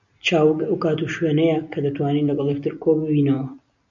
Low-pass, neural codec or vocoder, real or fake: 7.2 kHz; none; real